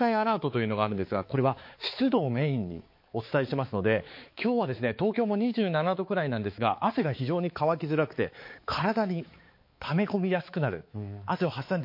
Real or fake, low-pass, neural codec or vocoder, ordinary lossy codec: fake; 5.4 kHz; codec, 16 kHz, 4 kbps, X-Codec, HuBERT features, trained on balanced general audio; MP3, 32 kbps